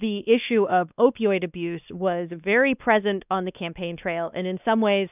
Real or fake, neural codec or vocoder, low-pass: fake; codec, 16 kHz, 2 kbps, X-Codec, WavLM features, trained on Multilingual LibriSpeech; 3.6 kHz